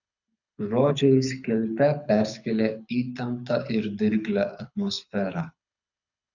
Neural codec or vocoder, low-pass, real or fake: codec, 24 kHz, 6 kbps, HILCodec; 7.2 kHz; fake